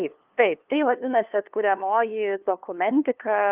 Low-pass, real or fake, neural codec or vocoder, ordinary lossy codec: 3.6 kHz; fake; codec, 16 kHz, 2 kbps, FunCodec, trained on LibriTTS, 25 frames a second; Opus, 32 kbps